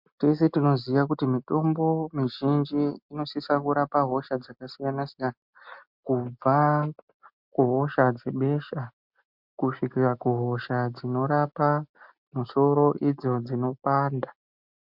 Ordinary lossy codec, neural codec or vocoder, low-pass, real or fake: MP3, 48 kbps; none; 5.4 kHz; real